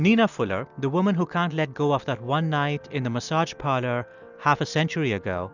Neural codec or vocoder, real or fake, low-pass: none; real; 7.2 kHz